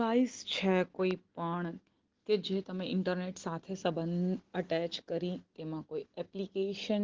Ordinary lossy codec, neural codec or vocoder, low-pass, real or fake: Opus, 16 kbps; none; 7.2 kHz; real